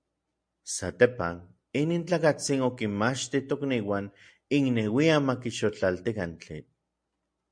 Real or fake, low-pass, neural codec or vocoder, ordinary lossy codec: real; 9.9 kHz; none; MP3, 64 kbps